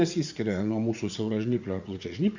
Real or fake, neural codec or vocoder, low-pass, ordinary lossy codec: fake; vocoder, 44.1 kHz, 80 mel bands, Vocos; 7.2 kHz; Opus, 64 kbps